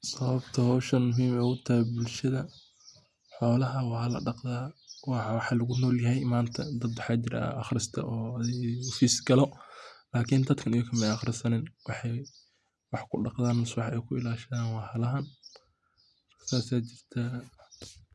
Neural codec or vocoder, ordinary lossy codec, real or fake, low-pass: none; none; real; none